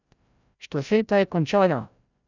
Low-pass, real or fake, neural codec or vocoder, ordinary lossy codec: 7.2 kHz; fake; codec, 16 kHz, 0.5 kbps, FreqCodec, larger model; none